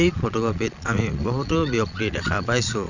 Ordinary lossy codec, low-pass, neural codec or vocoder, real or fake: none; 7.2 kHz; vocoder, 22.05 kHz, 80 mel bands, Vocos; fake